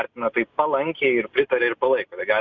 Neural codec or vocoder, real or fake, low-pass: none; real; 7.2 kHz